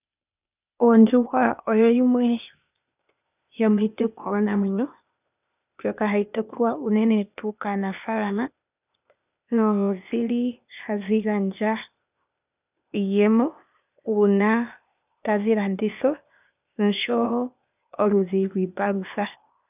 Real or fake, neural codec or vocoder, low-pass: fake; codec, 16 kHz, 0.8 kbps, ZipCodec; 3.6 kHz